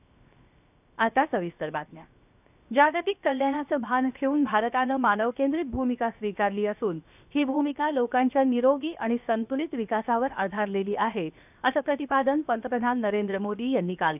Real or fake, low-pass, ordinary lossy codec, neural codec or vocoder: fake; 3.6 kHz; none; codec, 16 kHz, 0.7 kbps, FocalCodec